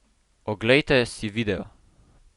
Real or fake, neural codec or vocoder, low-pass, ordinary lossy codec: real; none; 10.8 kHz; Opus, 64 kbps